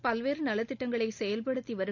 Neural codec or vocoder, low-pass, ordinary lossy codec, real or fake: none; 7.2 kHz; none; real